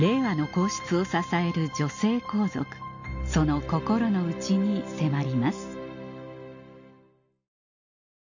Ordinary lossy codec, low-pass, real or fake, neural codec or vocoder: none; 7.2 kHz; real; none